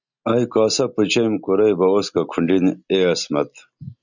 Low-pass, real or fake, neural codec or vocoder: 7.2 kHz; real; none